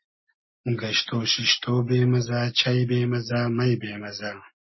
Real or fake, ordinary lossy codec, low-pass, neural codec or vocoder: real; MP3, 24 kbps; 7.2 kHz; none